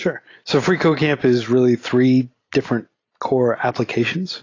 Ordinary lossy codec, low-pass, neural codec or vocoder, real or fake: AAC, 32 kbps; 7.2 kHz; none; real